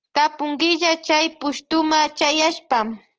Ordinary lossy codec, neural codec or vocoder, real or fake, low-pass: Opus, 16 kbps; none; real; 7.2 kHz